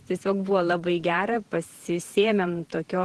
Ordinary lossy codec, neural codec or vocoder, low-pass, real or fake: Opus, 16 kbps; vocoder, 48 kHz, 128 mel bands, Vocos; 10.8 kHz; fake